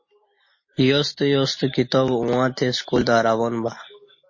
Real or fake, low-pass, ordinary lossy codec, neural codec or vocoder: real; 7.2 kHz; MP3, 32 kbps; none